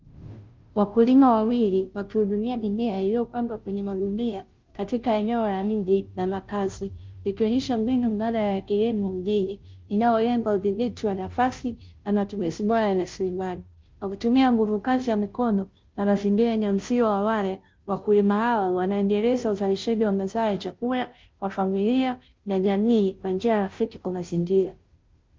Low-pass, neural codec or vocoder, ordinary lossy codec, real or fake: 7.2 kHz; codec, 16 kHz, 0.5 kbps, FunCodec, trained on Chinese and English, 25 frames a second; Opus, 32 kbps; fake